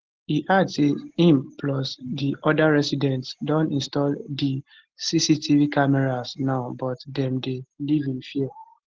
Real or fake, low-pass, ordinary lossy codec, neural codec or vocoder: real; 7.2 kHz; Opus, 16 kbps; none